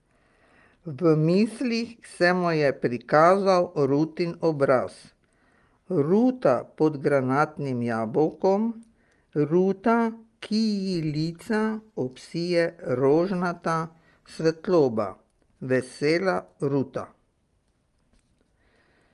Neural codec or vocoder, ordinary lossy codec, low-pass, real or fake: none; Opus, 32 kbps; 10.8 kHz; real